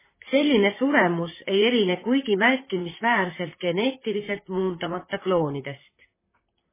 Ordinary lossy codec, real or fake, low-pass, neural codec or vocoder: MP3, 16 kbps; fake; 3.6 kHz; vocoder, 44.1 kHz, 128 mel bands, Pupu-Vocoder